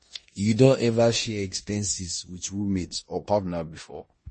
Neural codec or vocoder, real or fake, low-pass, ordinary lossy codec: codec, 16 kHz in and 24 kHz out, 0.9 kbps, LongCat-Audio-Codec, four codebook decoder; fake; 10.8 kHz; MP3, 32 kbps